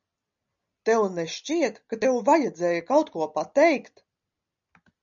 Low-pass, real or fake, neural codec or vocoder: 7.2 kHz; real; none